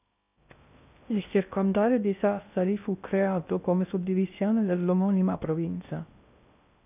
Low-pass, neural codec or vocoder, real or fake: 3.6 kHz; codec, 16 kHz in and 24 kHz out, 0.6 kbps, FocalCodec, streaming, 2048 codes; fake